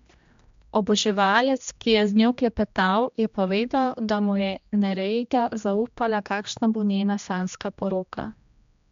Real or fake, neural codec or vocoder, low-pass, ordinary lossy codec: fake; codec, 16 kHz, 1 kbps, X-Codec, HuBERT features, trained on general audio; 7.2 kHz; MP3, 64 kbps